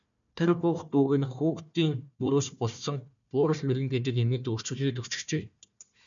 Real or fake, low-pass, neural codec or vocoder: fake; 7.2 kHz; codec, 16 kHz, 1 kbps, FunCodec, trained on Chinese and English, 50 frames a second